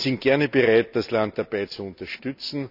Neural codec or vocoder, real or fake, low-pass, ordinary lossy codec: none; real; 5.4 kHz; none